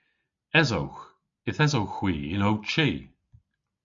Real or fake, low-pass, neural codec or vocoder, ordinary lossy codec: real; 7.2 kHz; none; MP3, 64 kbps